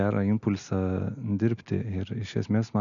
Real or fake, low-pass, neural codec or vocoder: real; 7.2 kHz; none